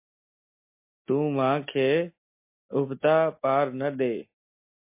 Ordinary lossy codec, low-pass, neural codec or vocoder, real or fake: MP3, 24 kbps; 3.6 kHz; none; real